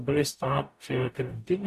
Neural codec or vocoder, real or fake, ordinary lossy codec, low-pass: codec, 44.1 kHz, 0.9 kbps, DAC; fake; AAC, 96 kbps; 14.4 kHz